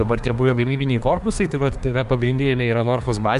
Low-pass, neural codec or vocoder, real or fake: 10.8 kHz; codec, 24 kHz, 1 kbps, SNAC; fake